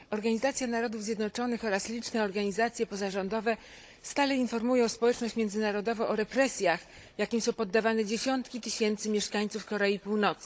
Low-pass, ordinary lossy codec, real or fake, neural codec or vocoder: none; none; fake; codec, 16 kHz, 16 kbps, FunCodec, trained on Chinese and English, 50 frames a second